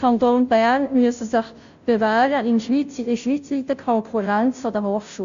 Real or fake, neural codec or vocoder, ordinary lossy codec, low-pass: fake; codec, 16 kHz, 0.5 kbps, FunCodec, trained on Chinese and English, 25 frames a second; AAC, 64 kbps; 7.2 kHz